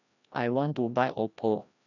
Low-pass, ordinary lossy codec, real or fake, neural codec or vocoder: 7.2 kHz; none; fake; codec, 16 kHz, 1 kbps, FreqCodec, larger model